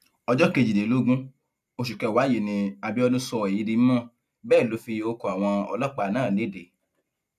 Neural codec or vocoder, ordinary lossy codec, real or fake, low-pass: none; none; real; 14.4 kHz